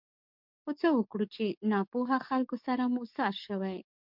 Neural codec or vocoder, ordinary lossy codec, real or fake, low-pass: codec, 44.1 kHz, 7.8 kbps, DAC; MP3, 48 kbps; fake; 5.4 kHz